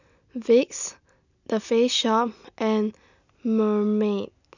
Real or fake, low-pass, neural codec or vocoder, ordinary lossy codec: real; 7.2 kHz; none; none